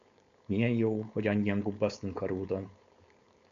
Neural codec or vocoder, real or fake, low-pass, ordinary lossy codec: codec, 16 kHz, 4.8 kbps, FACodec; fake; 7.2 kHz; AAC, 64 kbps